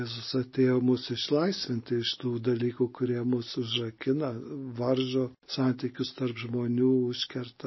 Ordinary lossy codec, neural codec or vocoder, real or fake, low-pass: MP3, 24 kbps; none; real; 7.2 kHz